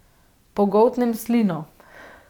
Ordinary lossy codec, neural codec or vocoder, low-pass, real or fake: none; vocoder, 44.1 kHz, 128 mel bands every 512 samples, BigVGAN v2; 19.8 kHz; fake